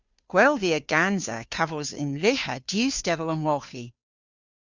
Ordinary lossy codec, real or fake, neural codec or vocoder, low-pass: Opus, 64 kbps; fake; codec, 16 kHz, 2 kbps, FunCodec, trained on Chinese and English, 25 frames a second; 7.2 kHz